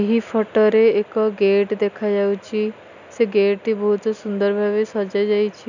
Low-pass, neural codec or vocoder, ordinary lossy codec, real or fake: 7.2 kHz; none; none; real